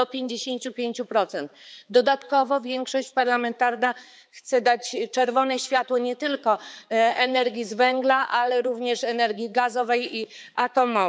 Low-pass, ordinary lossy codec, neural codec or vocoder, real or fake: none; none; codec, 16 kHz, 4 kbps, X-Codec, HuBERT features, trained on balanced general audio; fake